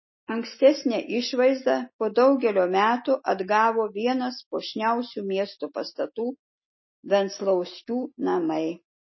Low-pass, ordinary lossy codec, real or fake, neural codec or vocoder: 7.2 kHz; MP3, 24 kbps; real; none